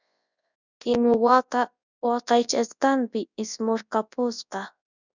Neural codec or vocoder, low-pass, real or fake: codec, 24 kHz, 0.9 kbps, WavTokenizer, large speech release; 7.2 kHz; fake